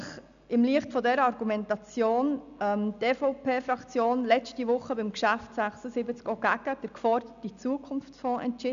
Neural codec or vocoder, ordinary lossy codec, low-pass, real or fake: none; AAC, 96 kbps; 7.2 kHz; real